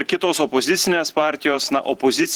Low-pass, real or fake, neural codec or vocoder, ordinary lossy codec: 19.8 kHz; fake; vocoder, 44.1 kHz, 128 mel bands every 512 samples, BigVGAN v2; Opus, 16 kbps